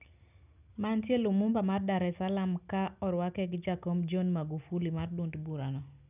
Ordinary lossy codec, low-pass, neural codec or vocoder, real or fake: none; 3.6 kHz; none; real